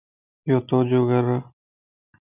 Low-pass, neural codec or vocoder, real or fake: 3.6 kHz; none; real